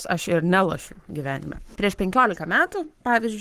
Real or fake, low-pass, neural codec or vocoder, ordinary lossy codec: fake; 14.4 kHz; codec, 44.1 kHz, 7.8 kbps, Pupu-Codec; Opus, 24 kbps